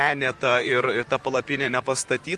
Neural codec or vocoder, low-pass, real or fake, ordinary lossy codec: vocoder, 44.1 kHz, 128 mel bands, Pupu-Vocoder; 10.8 kHz; fake; Opus, 32 kbps